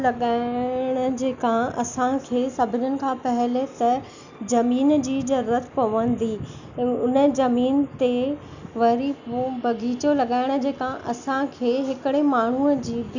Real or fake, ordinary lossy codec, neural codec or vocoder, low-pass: real; none; none; 7.2 kHz